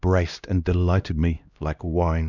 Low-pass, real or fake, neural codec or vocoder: 7.2 kHz; fake; codec, 16 kHz, 1 kbps, X-Codec, HuBERT features, trained on LibriSpeech